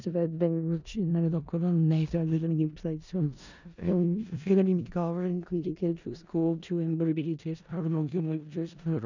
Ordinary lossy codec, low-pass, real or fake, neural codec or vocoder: none; 7.2 kHz; fake; codec, 16 kHz in and 24 kHz out, 0.4 kbps, LongCat-Audio-Codec, four codebook decoder